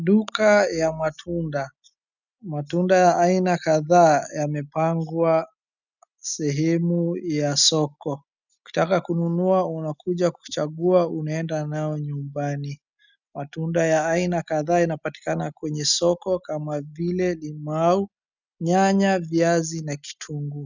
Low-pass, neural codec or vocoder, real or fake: 7.2 kHz; none; real